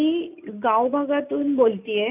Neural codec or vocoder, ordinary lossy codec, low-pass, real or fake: none; none; 3.6 kHz; real